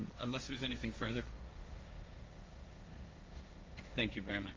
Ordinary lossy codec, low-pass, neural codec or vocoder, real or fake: Opus, 32 kbps; 7.2 kHz; codec, 16 kHz, 1.1 kbps, Voila-Tokenizer; fake